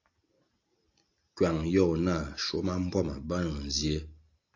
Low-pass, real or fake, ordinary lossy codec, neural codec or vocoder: 7.2 kHz; real; AAC, 48 kbps; none